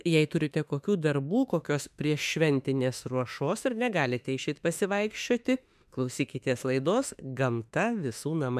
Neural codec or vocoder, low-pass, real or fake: autoencoder, 48 kHz, 32 numbers a frame, DAC-VAE, trained on Japanese speech; 14.4 kHz; fake